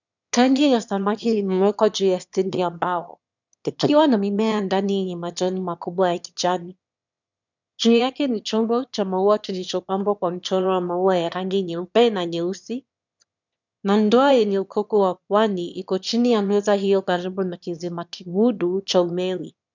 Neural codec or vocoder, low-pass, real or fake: autoencoder, 22.05 kHz, a latent of 192 numbers a frame, VITS, trained on one speaker; 7.2 kHz; fake